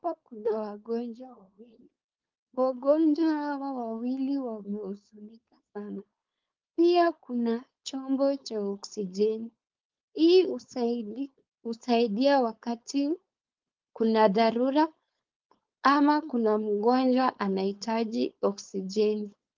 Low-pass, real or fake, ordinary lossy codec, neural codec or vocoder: 7.2 kHz; fake; Opus, 32 kbps; codec, 16 kHz, 4.8 kbps, FACodec